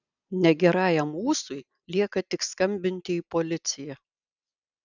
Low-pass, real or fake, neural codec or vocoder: 7.2 kHz; real; none